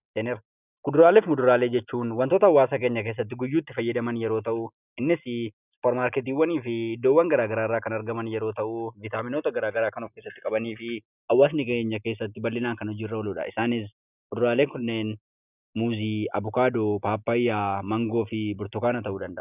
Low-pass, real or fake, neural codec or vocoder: 3.6 kHz; real; none